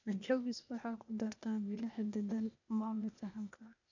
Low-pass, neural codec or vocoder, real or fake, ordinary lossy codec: 7.2 kHz; codec, 16 kHz, 0.8 kbps, ZipCodec; fake; none